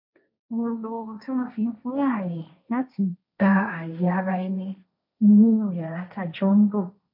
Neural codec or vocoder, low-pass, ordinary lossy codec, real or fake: codec, 16 kHz, 1.1 kbps, Voila-Tokenizer; 5.4 kHz; MP3, 32 kbps; fake